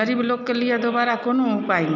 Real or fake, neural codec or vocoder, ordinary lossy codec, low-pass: real; none; none; 7.2 kHz